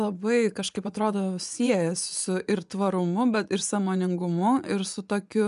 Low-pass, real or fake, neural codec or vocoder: 10.8 kHz; fake; vocoder, 24 kHz, 100 mel bands, Vocos